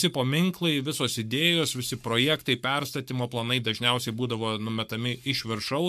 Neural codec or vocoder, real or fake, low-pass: codec, 44.1 kHz, 7.8 kbps, Pupu-Codec; fake; 14.4 kHz